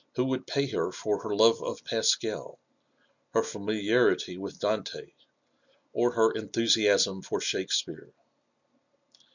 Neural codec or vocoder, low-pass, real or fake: none; 7.2 kHz; real